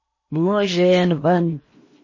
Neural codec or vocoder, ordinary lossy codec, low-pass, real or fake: codec, 16 kHz in and 24 kHz out, 0.8 kbps, FocalCodec, streaming, 65536 codes; MP3, 32 kbps; 7.2 kHz; fake